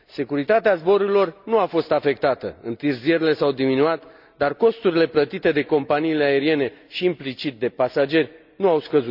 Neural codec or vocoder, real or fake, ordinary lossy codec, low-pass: none; real; none; 5.4 kHz